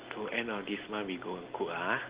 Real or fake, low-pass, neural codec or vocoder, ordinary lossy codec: real; 3.6 kHz; none; Opus, 16 kbps